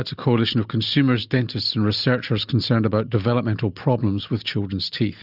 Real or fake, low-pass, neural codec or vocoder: real; 5.4 kHz; none